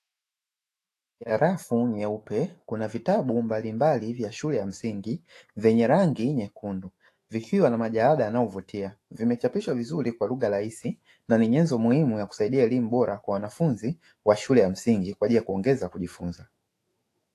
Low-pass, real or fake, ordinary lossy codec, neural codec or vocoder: 14.4 kHz; fake; AAC, 48 kbps; autoencoder, 48 kHz, 128 numbers a frame, DAC-VAE, trained on Japanese speech